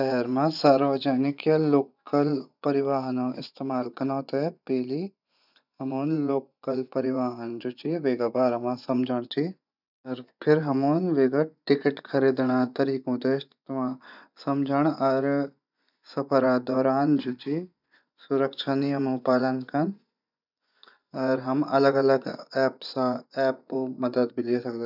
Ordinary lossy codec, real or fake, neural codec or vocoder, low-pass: none; fake; vocoder, 24 kHz, 100 mel bands, Vocos; 5.4 kHz